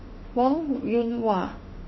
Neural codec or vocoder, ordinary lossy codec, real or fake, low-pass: autoencoder, 48 kHz, 32 numbers a frame, DAC-VAE, trained on Japanese speech; MP3, 24 kbps; fake; 7.2 kHz